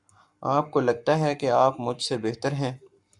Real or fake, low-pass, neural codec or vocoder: fake; 10.8 kHz; codec, 44.1 kHz, 7.8 kbps, Pupu-Codec